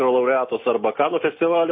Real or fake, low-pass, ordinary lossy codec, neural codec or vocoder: real; 7.2 kHz; MP3, 24 kbps; none